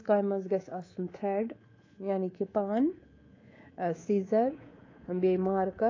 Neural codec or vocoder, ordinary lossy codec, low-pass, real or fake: codec, 24 kHz, 3.1 kbps, DualCodec; AAC, 32 kbps; 7.2 kHz; fake